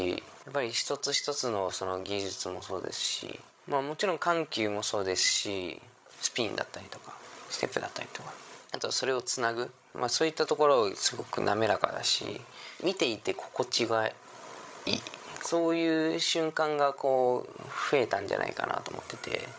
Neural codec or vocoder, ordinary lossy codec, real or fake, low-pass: codec, 16 kHz, 16 kbps, FreqCodec, larger model; none; fake; none